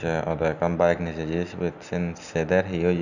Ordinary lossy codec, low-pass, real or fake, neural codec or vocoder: none; 7.2 kHz; real; none